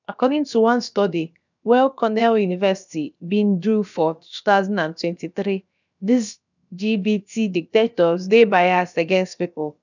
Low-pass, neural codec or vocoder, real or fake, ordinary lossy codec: 7.2 kHz; codec, 16 kHz, about 1 kbps, DyCAST, with the encoder's durations; fake; none